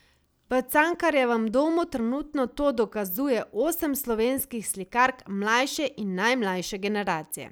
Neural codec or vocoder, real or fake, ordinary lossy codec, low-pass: none; real; none; none